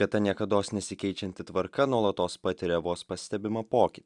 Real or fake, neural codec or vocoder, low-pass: real; none; 10.8 kHz